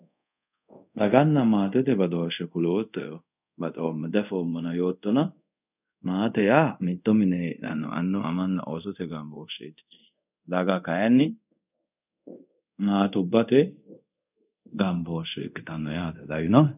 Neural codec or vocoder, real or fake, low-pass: codec, 24 kHz, 0.5 kbps, DualCodec; fake; 3.6 kHz